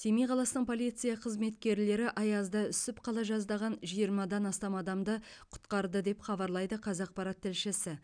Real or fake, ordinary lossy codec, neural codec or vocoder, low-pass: real; none; none; 9.9 kHz